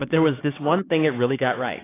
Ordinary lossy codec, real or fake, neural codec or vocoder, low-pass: AAC, 16 kbps; fake; codec, 16 kHz, 4 kbps, X-Codec, HuBERT features, trained on LibriSpeech; 3.6 kHz